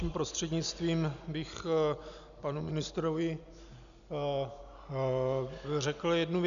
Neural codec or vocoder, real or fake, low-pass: none; real; 7.2 kHz